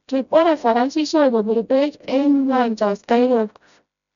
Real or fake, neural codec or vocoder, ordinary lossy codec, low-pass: fake; codec, 16 kHz, 0.5 kbps, FreqCodec, smaller model; none; 7.2 kHz